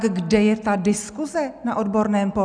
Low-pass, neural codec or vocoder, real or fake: 9.9 kHz; none; real